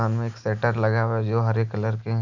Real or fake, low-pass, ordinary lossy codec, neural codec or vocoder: real; 7.2 kHz; none; none